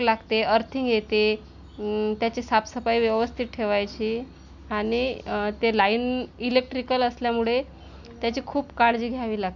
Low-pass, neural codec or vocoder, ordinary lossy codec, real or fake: 7.2 kHz; none; none; real